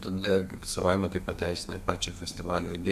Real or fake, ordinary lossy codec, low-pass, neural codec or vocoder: fake; AAC, 96 kbps; 14.4 kHz; codec, 32 kHz, 1.9 kbps, SNAC